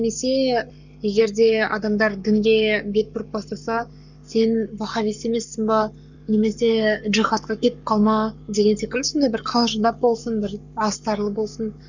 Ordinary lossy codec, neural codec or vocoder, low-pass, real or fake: none; codec, 44.1 kHz, 7.8 kbps, Pupu-Codec; 7.2 kHz; fake